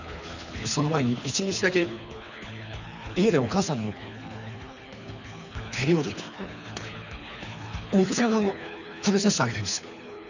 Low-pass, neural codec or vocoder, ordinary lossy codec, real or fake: 7.2 kHz; codec, 24 kHz, 3 kbps, HILCodec; none; fake